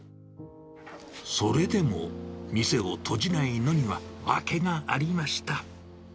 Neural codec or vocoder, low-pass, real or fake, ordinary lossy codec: none; none; real; none